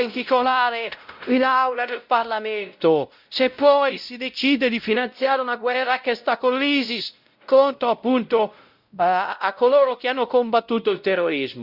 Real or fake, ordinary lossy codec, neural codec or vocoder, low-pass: fake; Opus, 64 kbps; codec, 16 kHz, 0.5 kbps, X-Codec, WavLM features, trained on Multilingual LibriSpeech; 5.4 kHz